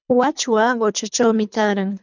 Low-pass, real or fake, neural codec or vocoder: 7.2 kHz; fake; codec, 24 kHz, 3 kbps, HILCodec